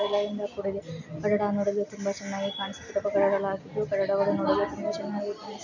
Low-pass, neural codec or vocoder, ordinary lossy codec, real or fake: 7.2 kHz; none; none; real